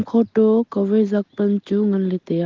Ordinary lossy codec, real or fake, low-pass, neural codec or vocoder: Opus, 16 kbps; real; 7.2 kHz; none